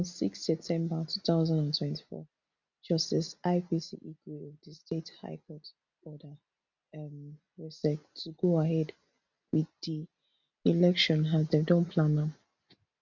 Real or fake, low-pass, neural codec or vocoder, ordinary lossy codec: real; 7.2 kHz; none; none